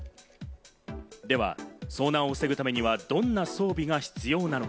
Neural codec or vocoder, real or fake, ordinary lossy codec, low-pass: none; real; none; none